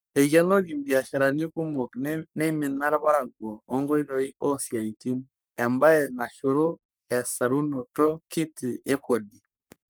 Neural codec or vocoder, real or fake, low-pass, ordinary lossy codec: codec, 44.1 kHz, 3.4 kbps, Pupu-Codec; fake; none; none